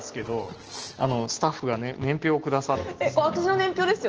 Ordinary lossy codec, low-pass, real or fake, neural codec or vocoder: Opus, 16 kbps; 7.2 kHz; real; none